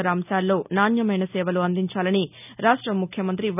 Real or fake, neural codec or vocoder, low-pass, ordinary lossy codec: real; none; 3.6 kHz; none